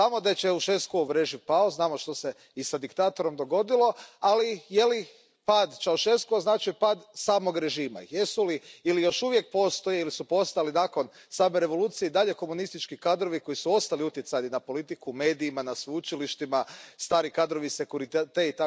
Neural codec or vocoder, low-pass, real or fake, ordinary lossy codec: none; none; real; none